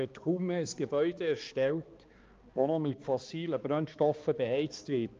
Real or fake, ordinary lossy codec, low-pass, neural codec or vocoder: fake; Opus, 24 kbps; 7.2 kHz; codec, 16 kHz, 2 kbps, X-Codec, HuBERT features, trained on balanced general audio